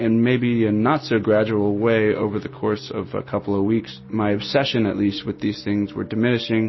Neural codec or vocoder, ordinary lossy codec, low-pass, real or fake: none; MP3, 24 kbps; 7.2 kHz; real